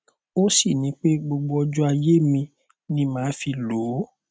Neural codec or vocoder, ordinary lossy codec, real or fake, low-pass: none; none; real; none